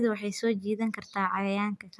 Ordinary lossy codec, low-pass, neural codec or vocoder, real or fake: none; none; none; real